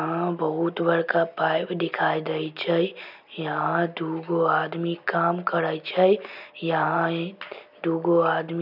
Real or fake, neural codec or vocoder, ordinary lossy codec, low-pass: real; none; none; 5.4 kHz